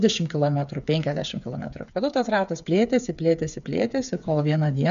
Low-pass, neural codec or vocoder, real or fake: 7.2 kHz; codec, 16 kHz, 8 kbps, FreqCodec, smaller model; fake